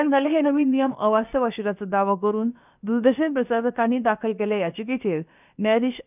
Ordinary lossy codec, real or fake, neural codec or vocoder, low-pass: none; fake; codec, 16 kHz, about 1 kbps, DyCAST, with the encoder's durations; 3.6 kHz